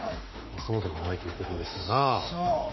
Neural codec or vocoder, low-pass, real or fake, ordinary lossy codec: autoencoder, 48 kHz, 32 numbers a frame, DAC-VAE, trained on Japanese speech; 7.2 kHz; fake; MP3, 24 kbps